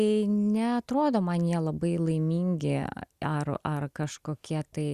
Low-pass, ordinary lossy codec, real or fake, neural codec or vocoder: 14.4 kHz; Opus, 64 kbps; real; none